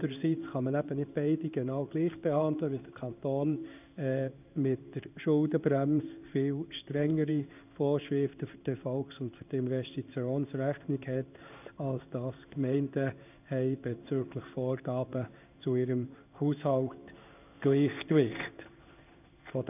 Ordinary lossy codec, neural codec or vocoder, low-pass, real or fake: none; codec, 16 kHz in and 24 kHz out, 1 kbps, XY-Tokenizer; 3.6 kHz; fake